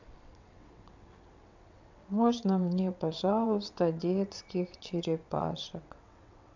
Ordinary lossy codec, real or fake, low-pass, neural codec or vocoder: none; fake; 7.2 kHz; vocoder, 22.05 kHz, 80 mel bands, WaveNeXt